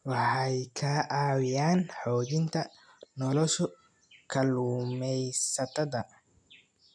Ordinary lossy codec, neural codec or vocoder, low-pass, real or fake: none; none; 9.9 kHz; real